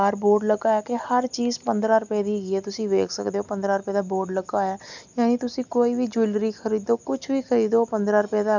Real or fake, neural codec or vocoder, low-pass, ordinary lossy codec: real; none; 7.2 kHz; none